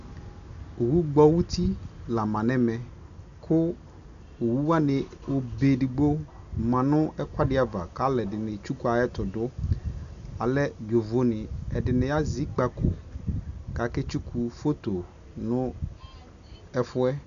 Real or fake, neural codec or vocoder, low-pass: real; none; 7.2 kHz